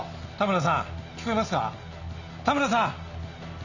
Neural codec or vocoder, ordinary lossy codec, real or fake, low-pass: none; none; real; 7.2 kHz